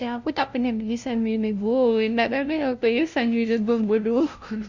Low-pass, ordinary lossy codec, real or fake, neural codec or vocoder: 7.2 kHz; Opus, 64 kbps; fake; codec, 16 kHz, 0.5 kbps, FunCodec, trained on LibriTTS, 25 frames a second